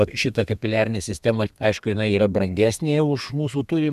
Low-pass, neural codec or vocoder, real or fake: 14.4 kHz; codec, 32 kHz, 1.9 kbps, SNAC; fake